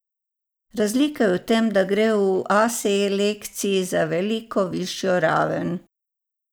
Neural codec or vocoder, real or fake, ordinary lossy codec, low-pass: none; real; none; none